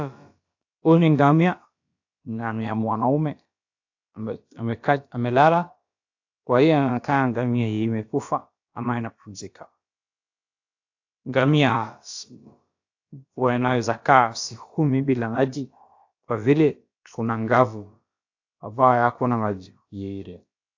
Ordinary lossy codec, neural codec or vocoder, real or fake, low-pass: AAC, 48 kbps; codec, 16 kHz, about 1 kbps, DyCAST, with the encoder's durations; fake; 7.2 kHz